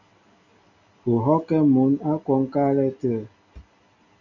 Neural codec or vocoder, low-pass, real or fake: none; 7.2 kHz; real